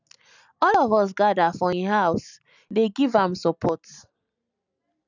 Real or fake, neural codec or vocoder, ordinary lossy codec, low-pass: real; none; none; 7.2 kHz